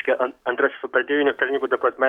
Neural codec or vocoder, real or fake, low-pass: codec, 44.1 kHz, 7.8 kbps, DAC; fake; 19.8 kHz